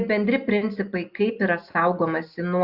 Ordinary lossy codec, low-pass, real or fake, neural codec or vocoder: Opus, 64 kbps; 5.4 kHz; real; none